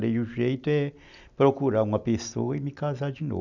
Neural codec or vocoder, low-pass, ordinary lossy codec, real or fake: none; 7.2 kHz; none; real